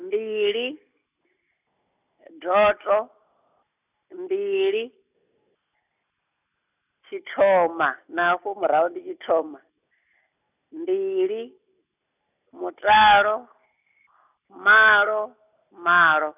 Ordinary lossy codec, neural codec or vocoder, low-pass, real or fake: none; none; 3.6 kHz; real